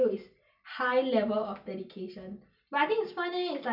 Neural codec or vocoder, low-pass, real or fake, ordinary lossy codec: none; 5.4 kHz; real; none